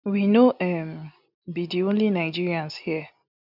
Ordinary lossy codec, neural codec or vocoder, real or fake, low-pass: none; none; real; 5.4 kHz